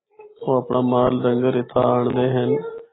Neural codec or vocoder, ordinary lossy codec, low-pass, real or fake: none; AAC, 16 kbps; 7.2 kHz; real